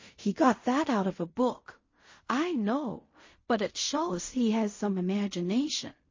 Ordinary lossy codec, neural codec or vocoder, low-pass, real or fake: MP3, 32 kbps; codec, 16 kHz in and 24 kHz out, 0.4 kbps, LongCat-Audio-Codec, fine tuned four codebook decoder; 7.2 kHz; fake